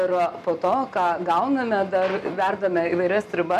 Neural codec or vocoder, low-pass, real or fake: none; 14.4 kHz; real